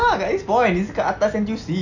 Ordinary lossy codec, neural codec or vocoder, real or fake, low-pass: none; none; real; 7.2 kHz